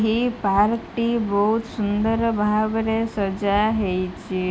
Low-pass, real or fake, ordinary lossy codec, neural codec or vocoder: none; real; none; none